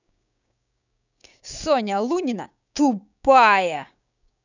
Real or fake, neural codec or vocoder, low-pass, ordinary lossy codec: fake; codec, 16 kHz, 6 kbps, DAC; 7.2 kHz; none